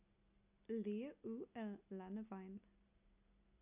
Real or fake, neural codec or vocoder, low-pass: real; none; 3.6 kHz